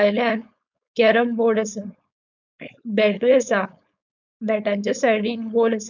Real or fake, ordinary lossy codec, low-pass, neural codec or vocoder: fake; none; 7.2 kHz; codec, 16 kHz, 4.8 kbps, FACodec